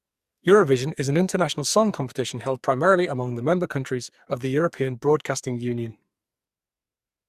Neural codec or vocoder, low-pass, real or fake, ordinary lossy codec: codec, 44.1 kHz, 2.6 kbps, SNAC; 14.4 kHz; fake; Opus, 64 kbps